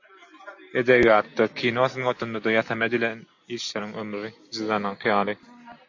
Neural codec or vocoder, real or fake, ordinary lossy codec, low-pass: none; real; AAC, 48 kbps; 7.2 kHz